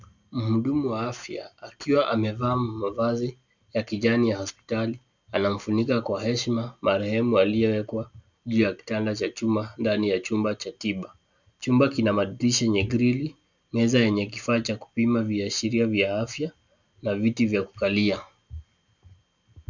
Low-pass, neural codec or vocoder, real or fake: 7.2 kHz; none; real